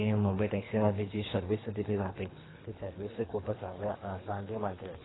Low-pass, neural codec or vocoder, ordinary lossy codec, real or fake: 7.2 kHz; codec, 24 kHz, 3 kbps, HILCodec; AAC, 16 kbps; fake